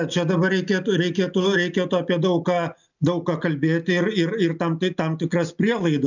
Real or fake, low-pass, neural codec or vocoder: real; 7.2 kHz; none